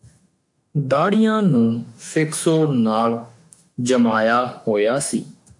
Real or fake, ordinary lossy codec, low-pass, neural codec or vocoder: fake; AAC, 64 kbps; 10.8 kHz; autoencoder, 48 kHz, 32 numbers a frame, DAC-VAE, trained on Japanese speech